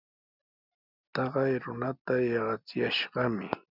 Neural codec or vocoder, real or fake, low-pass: none; real; 5.4 kHz